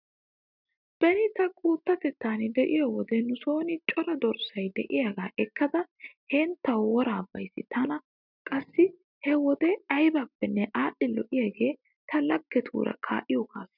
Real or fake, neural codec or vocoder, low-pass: real; none; 5.4 kHz